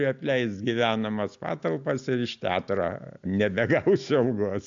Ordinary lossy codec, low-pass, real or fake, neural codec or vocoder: AAC, 64 kbps; 7.2 kHz; real; none